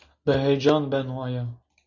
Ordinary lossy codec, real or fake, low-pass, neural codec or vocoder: MP3, 48 kbps; real; 7.2 kHz; none